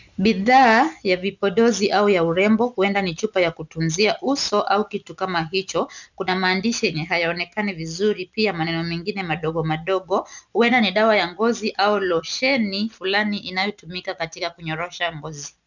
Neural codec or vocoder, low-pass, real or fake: none; 7.2 kHz; real